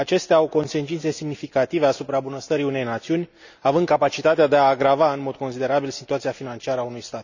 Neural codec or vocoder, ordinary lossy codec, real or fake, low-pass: none; none; real; 7.2 kHz